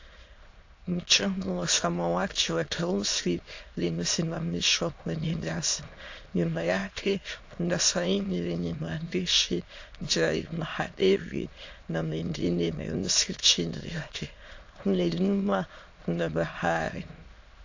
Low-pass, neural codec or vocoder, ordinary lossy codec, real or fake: 7.2 kHz; autoencoder, 22.05 kHz, a latent of 192 numbers a frame, VITS, trained on many speakers; AAC, 48 kbps; fake